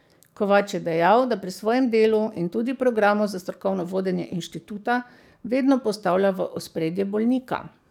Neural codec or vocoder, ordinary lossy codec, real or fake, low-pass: codec, 44.1 kHz, 7.8 kbps, DAC; none; fake; 19.8 kHz